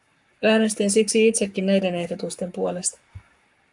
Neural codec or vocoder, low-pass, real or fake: codec, 44.1 kHz, 7.8 kbps, Pupu-Codec; 10.8 kHz; fake